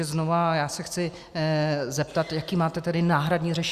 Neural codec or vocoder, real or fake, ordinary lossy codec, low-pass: none; real; Opus, 64 kbps; 14.4 kHz